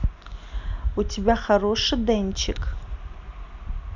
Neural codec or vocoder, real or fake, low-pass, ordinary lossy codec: none; real; 7.2 kHz; none